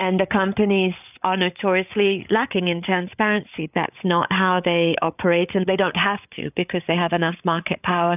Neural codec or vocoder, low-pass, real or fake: codec, 16 kHz, 8 kbps, FreqCodec, larger model; 3.6 kHz; fake